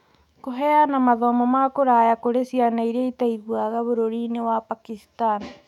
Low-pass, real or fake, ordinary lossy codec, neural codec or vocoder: 19.8 kHz; fake; none; autoencoder, 48 kHz, 128 numbers a frame, DAC-VAE, trained on Japanese speech